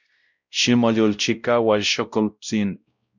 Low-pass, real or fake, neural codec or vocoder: 7.2 kHz; fake; codec, 16 kHz, 0.5 kbps, X-Codec, WavLM features, trained on Multilingual LibriSpeech